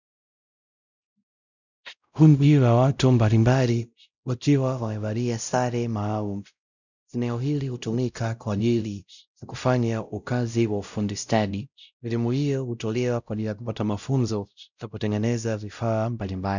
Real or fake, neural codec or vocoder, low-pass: fake; codec, 16 kHz, 0.5 kbps, X-Codec, WavLM features, trained on Multilingual LibriSpeech; 7.2 kHz